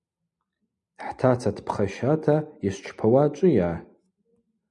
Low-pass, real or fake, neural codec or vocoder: 10.8 kHz; real; none